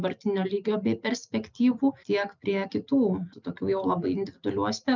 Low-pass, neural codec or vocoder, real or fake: 7.2 kHz; none; real